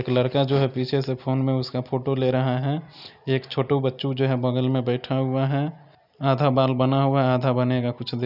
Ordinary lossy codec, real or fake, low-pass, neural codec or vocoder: none; real; 5.4 kHz; none